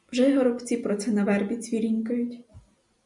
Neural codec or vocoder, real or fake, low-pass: none; real; 10.8 kHz